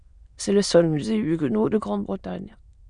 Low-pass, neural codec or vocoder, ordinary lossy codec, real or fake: 9.9 kHz; autoencoder, 22.05 kHz, a latent of 192 numbers a frame, VITS, trained on many speakers; Opus, 64 kbps; fake